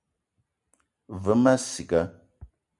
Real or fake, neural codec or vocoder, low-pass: real; none; 10.8 kHz